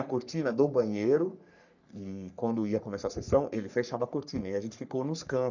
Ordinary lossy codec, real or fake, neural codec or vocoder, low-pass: none; fake; codec, 44.1 kHz, 3.4 kbps, Pupu-Codec; 7.2 kHz